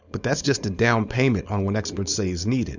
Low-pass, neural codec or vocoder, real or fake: 7.2 kHz; codec, 16 kHz, 4.8 kbps, FACodec; fake